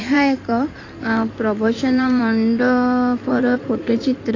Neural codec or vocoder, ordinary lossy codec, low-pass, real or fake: codec, 16 kHz in and 24 kHz out, 2.2 kbps, FireRedTTS-2 codec; AAC, 32 kbps; 7.2 kHz; fake